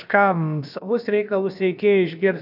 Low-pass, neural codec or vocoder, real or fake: 5.4 kHz; codec, 16 kHz, about 1 kbps, DyCAST, with the encoder's durations; fake